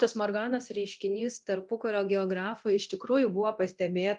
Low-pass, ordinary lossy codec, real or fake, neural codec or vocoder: 10.8 kHz; Opus, 32 kbps; fake; codec, 24 kHz, 0.9 kbps, DualCodec